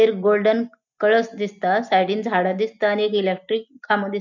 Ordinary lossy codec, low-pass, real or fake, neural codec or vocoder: none; 7.2 kHz; real; none